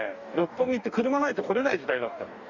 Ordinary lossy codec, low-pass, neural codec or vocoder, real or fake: none; 7.2 kHz; codec, 44.1 kHz, 2.6 kbps, DAC; fake